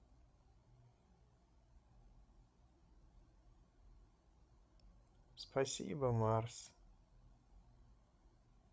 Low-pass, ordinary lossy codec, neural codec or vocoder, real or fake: none; none; codec, 16 kHz, 16 kbps, FreqCodec, larger model; fake